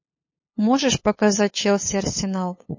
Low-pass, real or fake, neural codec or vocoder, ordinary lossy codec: 7.2 kHz; fake; codec, 16 kHz, 8 kbps, FunCodec, trained on LibriTTS, 25 frames a second; MP3, 32 kbps